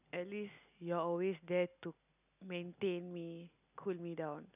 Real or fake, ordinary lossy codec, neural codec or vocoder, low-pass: real; none; none; 3.6 kHz